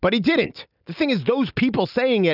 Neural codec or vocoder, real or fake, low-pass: none; real; 5.4 kHz